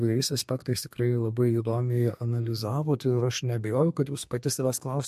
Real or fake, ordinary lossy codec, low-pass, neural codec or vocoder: fake; MP3, 64 kbps; 14.4 kHz; codec, 32 kHz, 1.9 kbps, SNAC